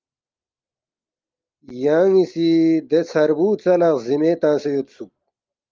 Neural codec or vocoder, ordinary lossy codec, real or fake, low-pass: none; Opus, 24 kbps; real; 7.2 kHz